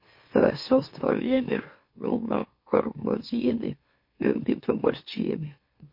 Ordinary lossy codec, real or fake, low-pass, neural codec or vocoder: MP3, 32 kbps; fake; 5.4 kHz; autoencoder, 44.1 kHz, a latent of 192 numbers a frame, MeloTTS